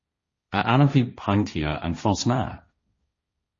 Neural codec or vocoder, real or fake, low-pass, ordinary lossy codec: codec, 16 kHz, 1.1 kbps, Voila-Tokenizer; fake; 7.2 kHz; MP3, 32 kbps